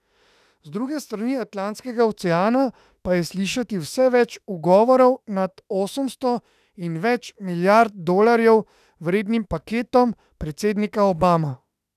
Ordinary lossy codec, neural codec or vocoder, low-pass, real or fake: none; autoencoder, 48 kHz, 32 numbers a frame, DAC-VAE, trained on Japanese speech; 14.4 kHz; fake